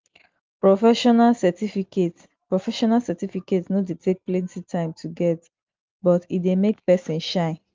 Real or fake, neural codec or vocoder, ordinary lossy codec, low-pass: real; none; Opus, 32 kbps; 7.2 kHz